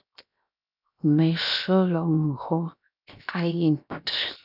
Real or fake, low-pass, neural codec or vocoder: fake; 5.4 kHz; codec, 16 kHz, 0.7 kbps, FocalCodec